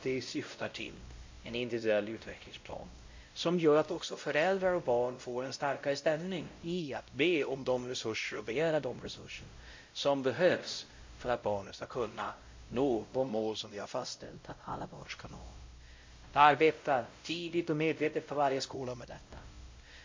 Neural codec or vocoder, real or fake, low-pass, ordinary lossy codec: codec, 16 kHz, 0.5 kbps, X-Codec, WavLM features, trained on Multilingual LibriSpeech; fake; 7.2 kHz; MP3, 48 kbps